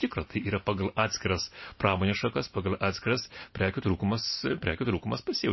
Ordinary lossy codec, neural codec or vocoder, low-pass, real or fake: MP3, 24 kbps; none; 7.2 kHz; real